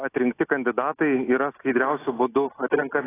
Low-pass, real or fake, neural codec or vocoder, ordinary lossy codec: 3.6 kHz; real; none; AAC, 16 kbps